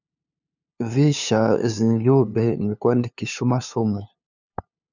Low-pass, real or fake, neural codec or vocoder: 7.2 kHz; fake; codec, 16 kHz, 2 kbps, FunCodec, trained on LibriTTS, 25 frames a second